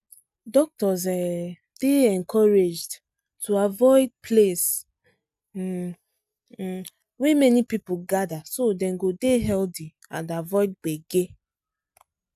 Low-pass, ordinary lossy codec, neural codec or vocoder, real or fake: 14.4 kHz; none; none; real